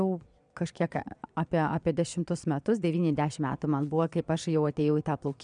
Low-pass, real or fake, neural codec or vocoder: 9.9 kHz; fake; vocoder, 22.05 kHz, 80 mel bands, Vocos